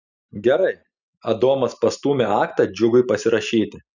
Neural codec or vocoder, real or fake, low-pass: none; real; 7.2 kHz